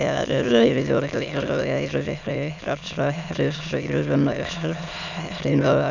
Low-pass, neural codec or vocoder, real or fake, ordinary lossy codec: 7.2 kHz; autoencoder, 22.05 kHz, a latent of 192 numbers a frame, VITS, trained on many speakers; fake; none